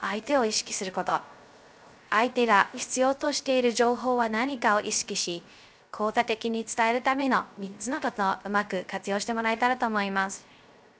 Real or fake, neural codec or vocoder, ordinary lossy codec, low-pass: fake; codec, 16 kHz, 0.3 kbps, FocalCodec; none; none